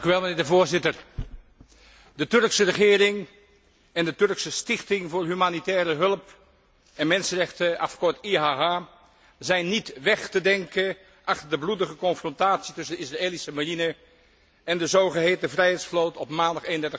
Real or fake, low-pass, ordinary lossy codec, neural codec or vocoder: real; none; none; none